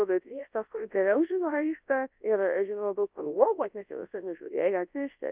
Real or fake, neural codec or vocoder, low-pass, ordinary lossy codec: fake; codec, 24 kHz, 0.9 kbps, WavTokenizer, large speech release; 3.6 kHz; Opus, 24 kbps